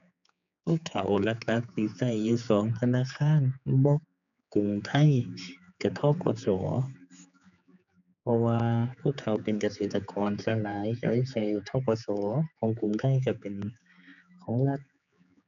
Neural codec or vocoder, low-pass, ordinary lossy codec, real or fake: codec, 16 kHz, 4 kbps, X-Codec, HuBERT features, trained on general audio; 7.2 kHz; none; fake